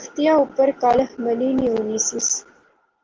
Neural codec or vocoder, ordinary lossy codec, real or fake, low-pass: none; Opus, 16 kbps; real; 7.2 kHz